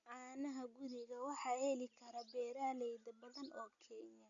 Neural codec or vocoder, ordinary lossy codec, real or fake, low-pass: none; none; real; 7.2 kHz